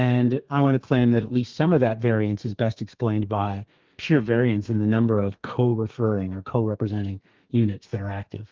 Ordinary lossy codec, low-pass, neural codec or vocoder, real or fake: Opus, 32 kbps; 7.2 kHz; codec, 32 kHz, 1.9 kbps, SNAC; fake